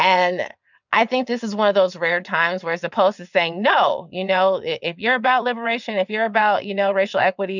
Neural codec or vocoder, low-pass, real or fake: vocoder, 22.05 kHz, 80 mel bands, WaveNeXt; 7.2 kHz; fake